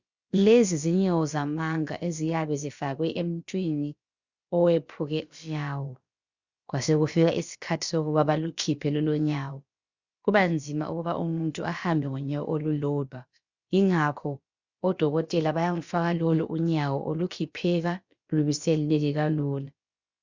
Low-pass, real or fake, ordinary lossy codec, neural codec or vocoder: 7.2 kHz; fake; Opus, 64 kbps; codec, 16 kHz, about 1 kbps, DyCAST, with the encoder's durations